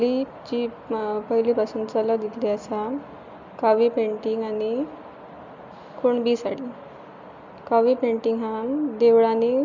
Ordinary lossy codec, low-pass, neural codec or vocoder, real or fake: MP3, 64 kbps; 7.2 kHz; none; real